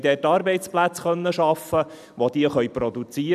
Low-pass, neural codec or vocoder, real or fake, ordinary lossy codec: 14.4 kHz; vocoder, 44.1 kHz, 128 mel bands every 256 samples, BigVGAN v2; fake; none